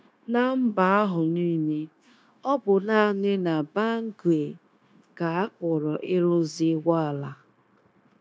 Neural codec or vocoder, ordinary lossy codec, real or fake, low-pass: codec, 16 kHz, 0.9 kbps, LongCat-Audio-Codec; none; fake; none